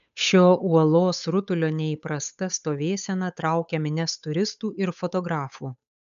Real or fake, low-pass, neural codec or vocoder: fake; 7.2 kHz; codec, 16 kHz, 8 kbps, FunCodec, trained on Chinese and English, 25 frames a second